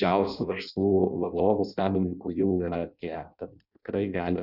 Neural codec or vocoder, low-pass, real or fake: codec, 16 kHz in and 24 kHz out, 0.6 kbps, FireRedTTS-2 codec; 5.4 kHz; fake